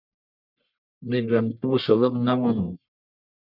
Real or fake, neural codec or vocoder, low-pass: fake; codec, 44.1 kHz, 1.7 kbps, Pupu-Codec; 5.4 kHz